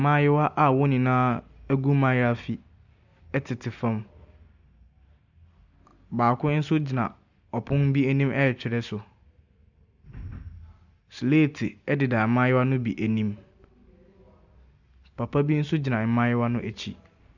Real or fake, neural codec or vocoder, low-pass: real; none; 7.2 kHz